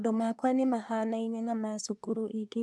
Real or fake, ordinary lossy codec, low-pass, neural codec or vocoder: fake; none; none; codec, 24 kHz, 1 kbps, SNAC